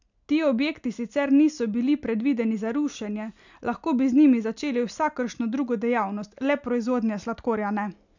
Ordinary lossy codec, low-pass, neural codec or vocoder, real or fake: none; 7.2 kHz; none; real